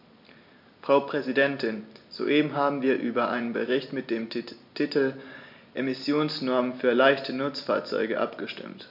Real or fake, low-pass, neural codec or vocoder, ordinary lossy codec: real; 5.4 kHz; none; MP3, 48 kbps